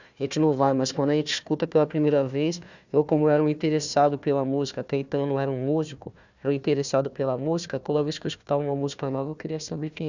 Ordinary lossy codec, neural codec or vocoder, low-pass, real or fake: none; codec, 16 kHz, 1 kbps, FunCodec, trained on Chinese and English, 50 frames a second; 7.2 kHz; fake